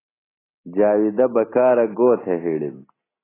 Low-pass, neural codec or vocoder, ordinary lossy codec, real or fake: 3.6 kHz; none; AAC, 16 kbps; real